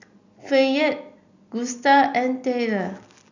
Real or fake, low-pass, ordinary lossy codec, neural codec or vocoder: real; 7.2 kHz; none; none